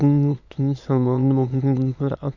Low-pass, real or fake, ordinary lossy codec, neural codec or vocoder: 7.2 kHz; fake; none; autoencoder, 22.05 kHz, a latent of 192 numbers a frame, VITS, trained on many speakers